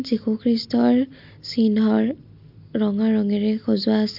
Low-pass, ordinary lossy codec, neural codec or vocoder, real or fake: 5.4 kHz; MP3, 48 kbps; none; real